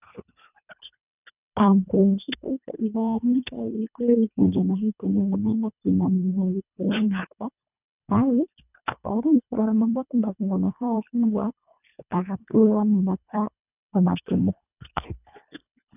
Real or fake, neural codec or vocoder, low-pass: fake; codec, 24 kHz, 1.5 kbps, HILCodec; 3.6 kHz